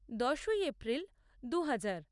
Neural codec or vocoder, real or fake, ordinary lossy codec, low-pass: none; real; none; 10.8 kHz